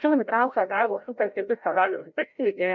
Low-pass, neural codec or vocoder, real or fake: 7.2 kHz; codec, 16 kHz, 0.5 kbps, FreqCodec, larger model; fake